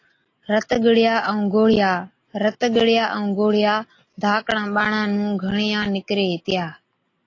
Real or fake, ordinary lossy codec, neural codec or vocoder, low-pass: real; AAC, 32 kbps; none; 7.2 kHz